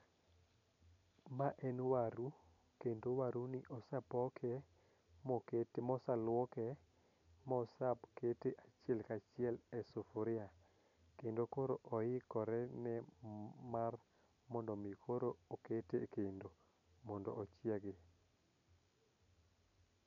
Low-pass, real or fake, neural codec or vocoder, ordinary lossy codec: 7.2 kHz; real; none; none